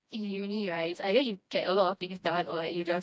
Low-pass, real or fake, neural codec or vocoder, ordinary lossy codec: none; fake; codec, 16 kHz, 1 kbps, FreqCodec, smaller model; none